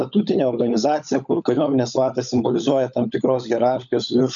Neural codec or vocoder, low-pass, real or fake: codec, 16 kHz, 16 kbps, FunCodec, trained on LibriTTS, 50 frames a second; 7.2 kHz; fake